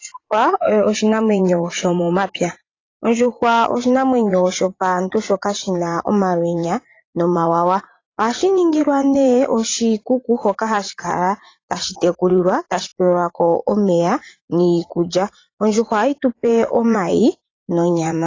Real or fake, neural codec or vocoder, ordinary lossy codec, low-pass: fake; vocoder, 44.1 kHz, 80 mel bands, Vocos; AAC, 32 kbps; 7.2 kHz